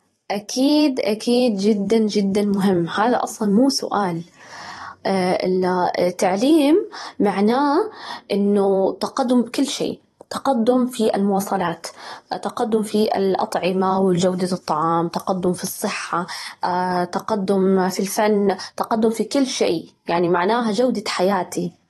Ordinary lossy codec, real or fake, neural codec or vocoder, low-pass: AAC, 32 kbps; fake; vocoder, 44.1 kHz, 128 mel bands every 512 samples, BigVGAN v2; 19.8 kHz